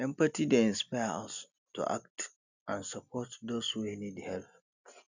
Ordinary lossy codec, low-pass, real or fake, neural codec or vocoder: none; 7.2 kHz; real; none